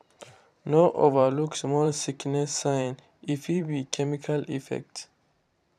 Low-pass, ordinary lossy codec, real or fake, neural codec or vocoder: 14.4 kHz; none; fake; vocoder, 44.1 kHz, 128 mel bands every 512 samples, BigVGAN v2